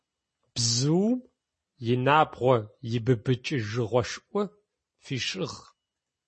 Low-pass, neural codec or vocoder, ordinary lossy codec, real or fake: 10.8 kHz; none; MP3, 32 kbps; real